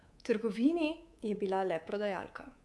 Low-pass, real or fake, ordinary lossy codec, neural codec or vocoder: none; fake; none; codec, 24 kHz, 3.1 kbps, DualCodec